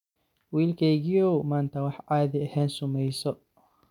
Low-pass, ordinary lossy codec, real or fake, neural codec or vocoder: 19.8 kHz; none; real; none